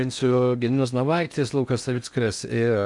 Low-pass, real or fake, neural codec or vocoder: 10.8 kHz; fake; codec, 16 kHz in and 24 kHz out, 0.6 kbps, FocalCodec, streaming, 4096 codes